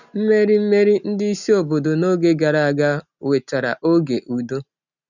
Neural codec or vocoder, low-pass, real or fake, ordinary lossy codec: none; 7.2 kHz; real; none